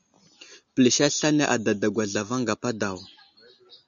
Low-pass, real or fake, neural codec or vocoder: 7.2 kHz; real; none